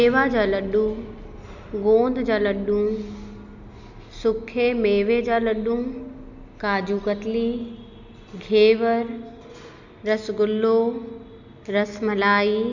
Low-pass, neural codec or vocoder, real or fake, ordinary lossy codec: 7.2 kHz; none; real; Opus, 64 kbps